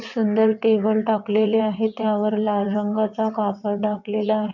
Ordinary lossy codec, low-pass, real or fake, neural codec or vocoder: none; 7.2 kHz; fake; vocoder, 22.05 kHz, 80 mel bands, WaveNeXt